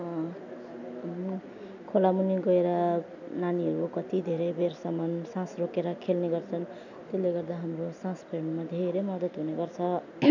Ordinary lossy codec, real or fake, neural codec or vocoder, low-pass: none; real; none; 7.2 kHz